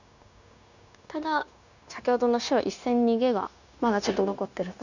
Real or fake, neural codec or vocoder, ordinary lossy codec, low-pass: fake; codec, 16 kHz, 0.9 kbps, LongCat-Audio-Codec; none; 7.2 kHz